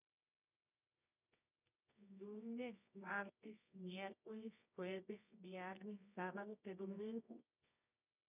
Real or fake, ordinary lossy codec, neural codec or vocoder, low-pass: fake; none; codec, 24 kHz, 0.9 kbps, WavTokenizer, medium music audio release; 3.6 kHz